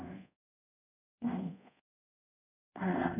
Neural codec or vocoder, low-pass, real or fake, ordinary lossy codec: codec, 24 kHz, 0.5 kbps, DualCodec; 3.6 kHz; fake; none